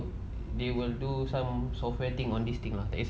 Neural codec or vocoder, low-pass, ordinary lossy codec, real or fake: none; none; none; real